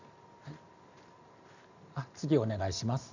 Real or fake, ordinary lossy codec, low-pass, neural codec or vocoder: real; none; 7.2 kHz; none